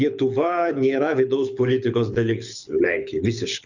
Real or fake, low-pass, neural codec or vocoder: fake; 7.2 kHz; codec, 24 kHz, 6 kbps, HILCodec